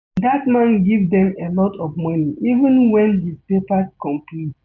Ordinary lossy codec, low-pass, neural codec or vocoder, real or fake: none; 7.2 kHz; none; real